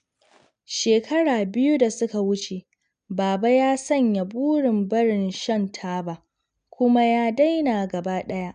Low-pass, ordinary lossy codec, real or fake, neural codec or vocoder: 9.9 kHz; none; real; none